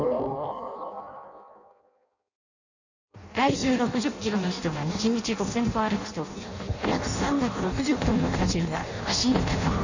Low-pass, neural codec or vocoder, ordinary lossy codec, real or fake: 7.2 kHz; codec, 16 kHz in and 24 kHz out, 0.6 kbps, FireRedTTS-2 codec; none; fake